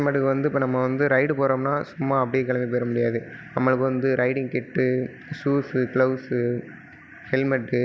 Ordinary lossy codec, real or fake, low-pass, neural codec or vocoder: none; real; none; none